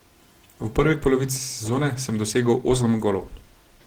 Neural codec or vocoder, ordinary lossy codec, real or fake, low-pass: none; Opus, 16 kbps; real; 19.8 kHz